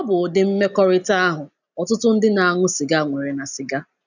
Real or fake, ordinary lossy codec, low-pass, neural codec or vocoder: real; none; 7.2 kHz; none